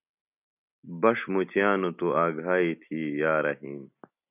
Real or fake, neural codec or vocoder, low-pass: real; none; 3.6 kHz